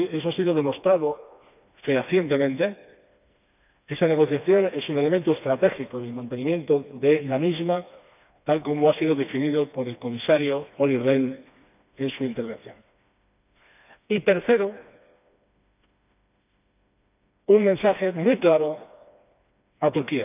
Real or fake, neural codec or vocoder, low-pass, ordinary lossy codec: fake; codec, 16 kHz, 2 kbps, FreqCodec, smaller model; 3.6 kHz; none